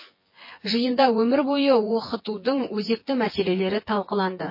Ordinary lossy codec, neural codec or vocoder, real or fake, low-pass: MP3, 24 kbps; vocoder, 24 kHz, 100 mel bands, Vocos; fake; 5.4 kHz